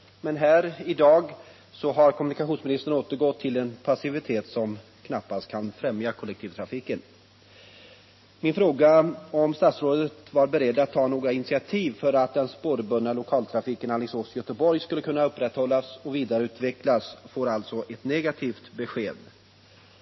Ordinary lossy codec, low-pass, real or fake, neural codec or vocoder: MP3, 24 kbps; 7.2 kHz; real; none